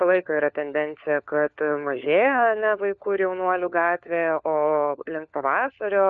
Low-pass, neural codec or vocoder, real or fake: 7.2 kHz; codec, 16 kHz, 4 kbps, FunCodec, trained on LibriTTS, 50 frames a second; fake